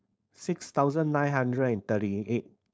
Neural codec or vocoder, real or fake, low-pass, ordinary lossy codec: codec, 16 kHz, 4.8 kbps, FACodec; fake; none; none